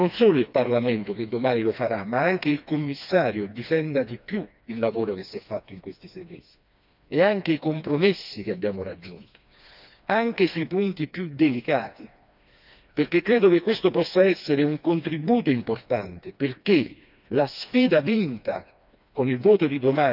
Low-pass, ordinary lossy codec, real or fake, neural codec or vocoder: 5.4 kHz; none; fake; codec, 16 kHz, 2 kbps, FreqCodec, smaller model